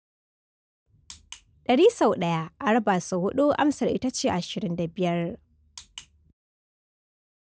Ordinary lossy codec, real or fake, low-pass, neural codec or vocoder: none; real; none; none